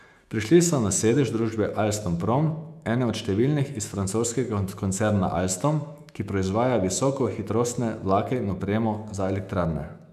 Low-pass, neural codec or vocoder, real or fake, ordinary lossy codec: 14.4 kHz; autoencoder, 48 kHz, 128 numbers a frame, DAC-VAE, trained on Japanese speech; fake; none